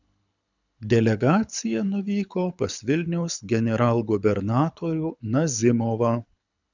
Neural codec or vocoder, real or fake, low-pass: codec, 24 kHz, 6 kbps, HILCodec; fake; 7.2 kHz